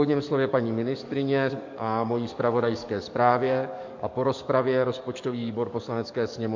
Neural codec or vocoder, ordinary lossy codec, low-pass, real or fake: codec, 44.1 kHz, 7.8 kbps, DAC; MP3, 48 kbps; 7.2 kHz; fake